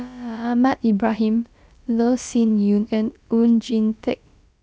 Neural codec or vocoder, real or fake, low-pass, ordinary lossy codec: codec, 16 kHz, about 1 kbps, DyCAST, with the encoder's durations; fake; none; none